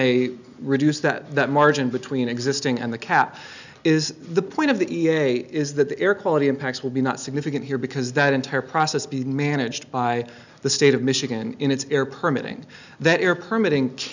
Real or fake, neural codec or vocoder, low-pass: real; none; 7.2 kHz